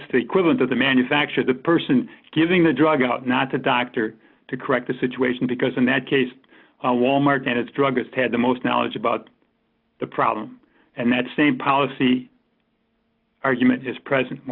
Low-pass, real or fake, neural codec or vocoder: 5.4 kHz; real; none